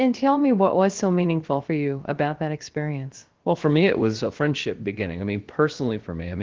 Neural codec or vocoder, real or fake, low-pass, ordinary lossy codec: codec, 16 kHz, about 1 kbps, DyCAST, with the encoder's durations; fake; 7.2 kHz; Opus, 16 kbps